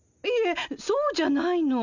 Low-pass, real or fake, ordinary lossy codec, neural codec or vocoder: 7.2 kHz; real; none; none